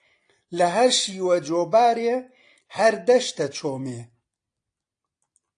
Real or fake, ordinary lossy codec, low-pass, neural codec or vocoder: real; AAC, 48 kbps; 9.9 kHz; none